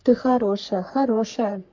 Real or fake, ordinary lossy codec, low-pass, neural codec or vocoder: fake; MP3, 48 kbps; 7.2 kHz; codec, 44.1 kHz, 3.4 kbps, Pupu-Codec